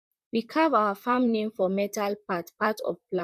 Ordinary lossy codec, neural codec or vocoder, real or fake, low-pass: none; vocoder, 44.1 kHz, 128 mel bands, Pupu-Vocoder; fake; 14.4 kHz